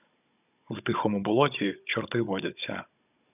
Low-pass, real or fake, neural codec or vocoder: 3.6 kHz; fake; codec, 16 kHz, 16 kbps, FunCodec, trained on Chinese and English, 50 frames a second